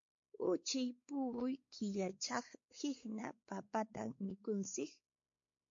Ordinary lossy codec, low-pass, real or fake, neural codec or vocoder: MP3, 48 kbps; 7.2 kHz; fake; codec, 16 kHz, 4 kbps, X-Codec, WavLM features, trained on Multilingual LibriSpeech